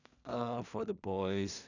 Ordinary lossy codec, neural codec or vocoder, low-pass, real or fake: none; codec, 16 kHz in and 24 kHz out, 0.4 kbps, LongCat-Audio-Codec, two codebook decoder; 7.2 kHz; fake